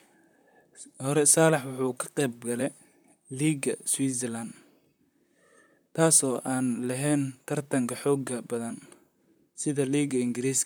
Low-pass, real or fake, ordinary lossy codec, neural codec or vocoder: none; fake; none; vocoder, 44.1 kHz, 128 mel bands, Pupu-Vocoder